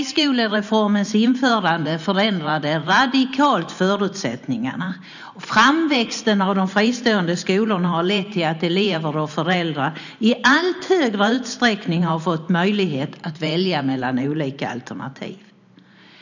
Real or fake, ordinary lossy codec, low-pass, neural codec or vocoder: fake; none; 7.2 kHz; vocoder, 44.1 kHz, 128 mel bands every 512 samples, BigVGAN v2